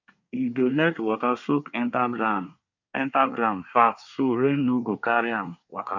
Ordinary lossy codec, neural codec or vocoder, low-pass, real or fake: none; codec, 24 kHz, 1 kbps, SNAC; 7.2 kHz; fake